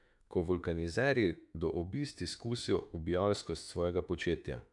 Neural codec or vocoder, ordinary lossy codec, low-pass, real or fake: autoencoder, 48 kHz, 32 numbers a frame, DAC-VAE, trained on Japanese speech; none; 10.8 kHz; fake